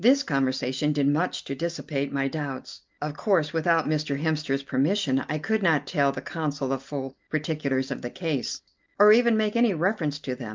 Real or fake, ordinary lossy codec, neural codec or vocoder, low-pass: real; Opus, 24 kbps; none; 7.2 kHz